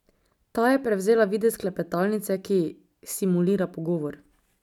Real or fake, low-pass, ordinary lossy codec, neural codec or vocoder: real; 19.8 kHz; none; none